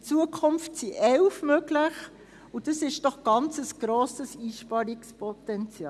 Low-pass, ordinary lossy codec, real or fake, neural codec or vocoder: none; none; real; none